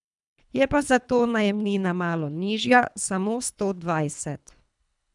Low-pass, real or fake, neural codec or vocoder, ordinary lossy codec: 10.8 kHz; fake; codec, 24 kHz, 3 kbps, HILCodec; none